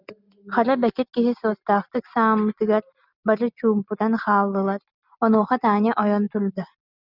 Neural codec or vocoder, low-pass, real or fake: none; 5.4 kHz; real